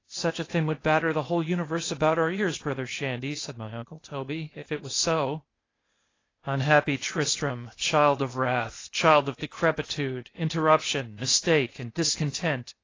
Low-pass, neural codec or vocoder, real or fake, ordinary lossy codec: 7.2 kHz; codec, 16 kHz, 0.8 kbps, ZipCodec; fake; AAC, 32 kbps